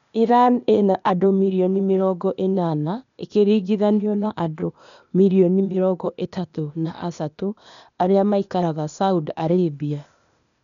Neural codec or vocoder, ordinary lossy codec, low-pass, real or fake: codec, 16 kHz, 0.8 kbps, ZipCodec; none; 7.2 kHz; fake